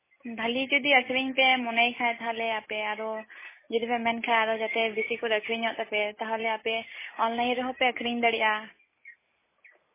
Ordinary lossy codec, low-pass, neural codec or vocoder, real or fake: MP3, 16 kbps; 3.6 kHz; none; real